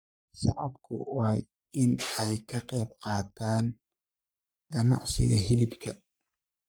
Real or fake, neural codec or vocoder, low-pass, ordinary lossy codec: fake; codec, 44.1 kHz, 3.4 kbps, Pupu-Codec; none; none